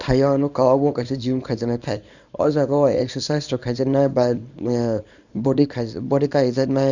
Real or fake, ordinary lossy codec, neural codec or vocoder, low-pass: fake; none; codec, 24 kHz, 0.9 kbps, WavTokenizer, small release; 7.2 kHz